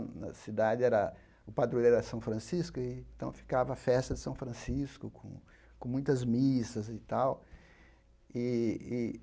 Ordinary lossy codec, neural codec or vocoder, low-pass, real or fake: none; none; none; real